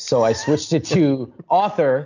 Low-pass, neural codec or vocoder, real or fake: 7.2 kHz; none; real